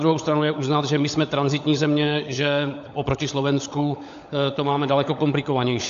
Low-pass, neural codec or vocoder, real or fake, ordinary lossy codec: 7.2 kHz; codec, 16 kHz, 16 kbps, FunCodec, trained on LibriTTS, 50 frames a second; fake; AAC, 64 kbps